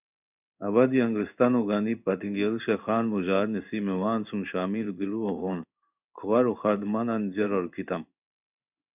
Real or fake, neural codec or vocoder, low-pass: fake; codec, 16 kHz in and 24 kHz out, 1 kbps, XY-Tokenizer; 3.6 kHz